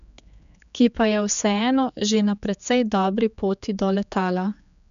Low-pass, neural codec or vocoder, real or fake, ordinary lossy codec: 7.2 kHz; codec, 16 kHz, 4 kbps, X-Codec, HuBERT features, trained on general audio; fake; none